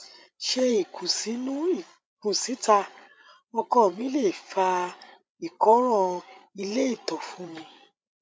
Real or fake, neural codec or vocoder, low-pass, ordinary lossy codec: fake; codec, 16 kHz, 8 kbps, FreqCodec, larger model; none; none